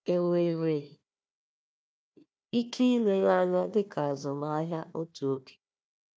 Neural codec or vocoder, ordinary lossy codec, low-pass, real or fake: codec, 16 kHz, 1 kbps, FunCodec, trained on Chinese and English, 50 frames a second; none; none; fake